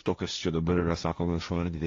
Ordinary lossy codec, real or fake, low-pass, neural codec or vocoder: AAC, 32 kbps; fake; 7.2 kHz; codec, 16 kHz, 1.1 kbps, Voila-Tokenizer